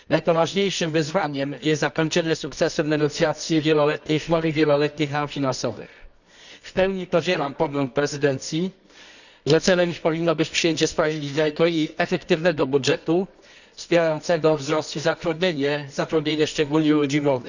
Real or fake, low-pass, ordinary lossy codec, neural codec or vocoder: fake; 7.2 kHz; none; codec, 24 kHz, 0.9 kbps, WavTokenizer, medium music audio release